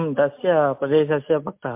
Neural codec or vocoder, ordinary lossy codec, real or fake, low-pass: none; MP3, 32 kbps; real; 3.6 kHz